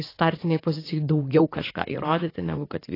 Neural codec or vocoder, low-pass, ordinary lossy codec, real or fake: vocoder, 44.1 kHz, 80 mel bands, Vocos; 5.4 kHz; AAC, 24 kbps; fake